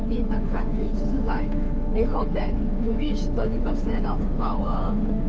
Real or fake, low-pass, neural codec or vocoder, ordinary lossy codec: fake; none; codec, 16 kHz, 2 kbps, FunCodec, trained on Chinese and English, 25 frames a second; none